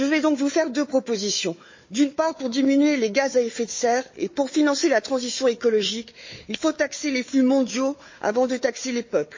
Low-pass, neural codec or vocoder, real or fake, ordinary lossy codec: 7.2 kHz; codec, 16 kHz, 4 kbps, FunCodec, trained on Chinese and English, 50 frames a second; fake; MP3, 32 kbps